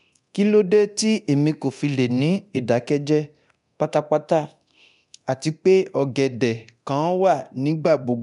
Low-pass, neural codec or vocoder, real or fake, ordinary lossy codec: 10.8 kHz; codec, 24 kHz, 0.9 kbps, DualCodec; fake; none